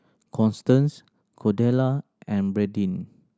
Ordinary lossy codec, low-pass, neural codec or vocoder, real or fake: none; none; none; real